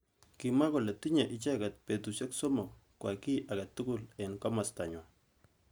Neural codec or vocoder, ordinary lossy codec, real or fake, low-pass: none; none; real; none